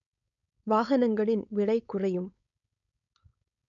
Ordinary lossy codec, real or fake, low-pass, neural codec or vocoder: none; fake; 7.2 kHz; codec, 16 kHz, 4.8 kbps, FACodec